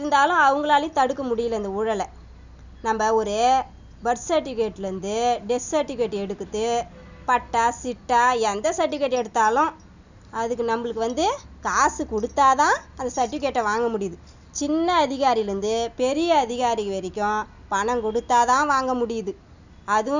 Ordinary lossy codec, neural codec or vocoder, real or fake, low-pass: none; none; real; 7.2 kHz